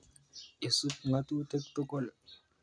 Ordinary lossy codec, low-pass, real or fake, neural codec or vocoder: none; 9.9 kHz; real; none